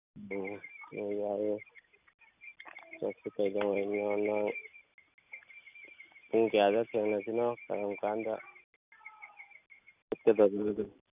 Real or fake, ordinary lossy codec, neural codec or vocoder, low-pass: real; none; none; 3.6 kHz